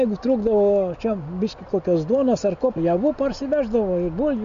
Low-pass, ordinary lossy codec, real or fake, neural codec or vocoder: 7.2 kHz; AAC, 64 kbps; real; none